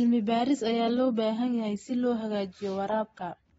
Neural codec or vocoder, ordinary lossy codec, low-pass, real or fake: none; AAC, 24 kbps; 14.4 kHz; real